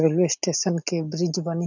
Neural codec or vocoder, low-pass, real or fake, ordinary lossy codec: none; 7.2 kHz; real; none